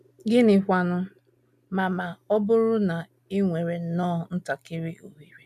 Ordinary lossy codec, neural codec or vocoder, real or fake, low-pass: none; none; real; 14.4 kHz